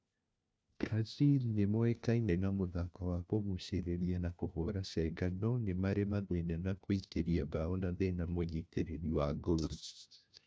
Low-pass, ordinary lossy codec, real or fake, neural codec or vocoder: none; none; fake; codec, 16 kHz, 1 kbps, FunCodec, trained on LibriTTS, 50 frames a second